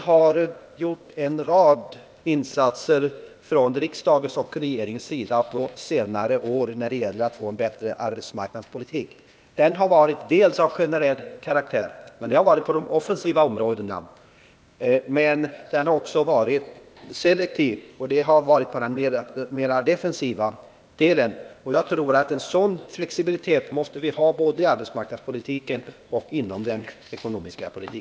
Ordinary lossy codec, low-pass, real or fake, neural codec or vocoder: none; none; fake; codec, 16 kHz, 0.8 kbps, ZipCodec